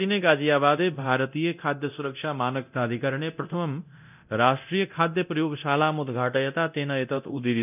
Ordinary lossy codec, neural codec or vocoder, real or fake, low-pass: none; codec, 24 kHz, 0.9 kbps, DualCodec; fake; 3.6 kHz